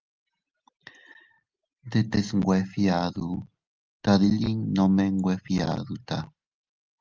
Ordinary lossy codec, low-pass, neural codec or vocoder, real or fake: Opus, 24 kbps; 7.2 kHz; none; real